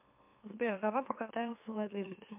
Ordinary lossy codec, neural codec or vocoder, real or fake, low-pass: MP3, 32 kbps; autoencoder, 44.1 kHz, a latent of 192 numbers a frame, MeloTTS; fake; 3.6 kHz